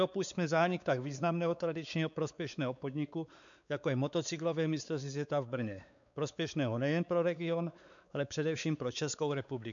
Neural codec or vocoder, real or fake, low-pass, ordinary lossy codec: codec, 16 kHz, 4 kbps, X-Codec, WavLM features, trained on Multilingual LibriSpeech; fake; 7.2 kHz; MP3, 96 kbps